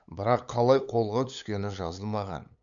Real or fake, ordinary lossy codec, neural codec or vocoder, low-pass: fake; none; codec, 16 kHz, 8 kbps, FunCodec, trained on LibriTTS, 25 frames a second; 7.2 kHz